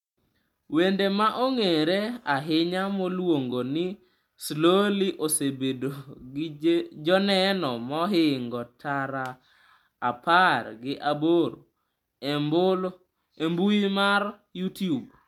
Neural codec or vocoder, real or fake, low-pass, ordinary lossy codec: none; real; 19.8 kHz; MP3, 96 kbps